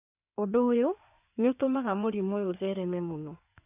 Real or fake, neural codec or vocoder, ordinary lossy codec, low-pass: fake; codec, 16 kHz in and 24 kHz out, 2.2 kbps, FireRedTTS-2 codec; none; 3.6 kHz